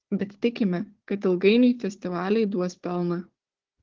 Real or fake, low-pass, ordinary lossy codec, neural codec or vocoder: fake; 7.2 kHz; Opus, 16 kbps; codec, 16 kHz, 4 kbps, FunCodec, trained on Chinese and English, 50 frames a second